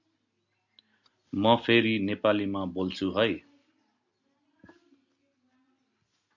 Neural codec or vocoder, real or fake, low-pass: none; real; 7.2 kHz